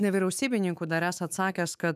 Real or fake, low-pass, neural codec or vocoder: fake; 14.4 kHz; autoencoder, 48 kHz, 128 numbers a frame, DAC-VAE, trained on Japanese speech